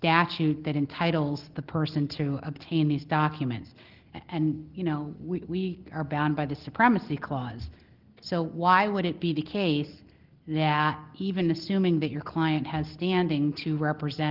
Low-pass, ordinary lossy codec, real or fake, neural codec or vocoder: 5.4 kHz; Opus, 16 kbps; real; none